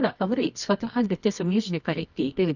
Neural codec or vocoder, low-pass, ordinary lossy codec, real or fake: codec, 24 kHz, 0.9 kbps, WavTokenizer, medium music audio release; 7.2 kHz; none; fake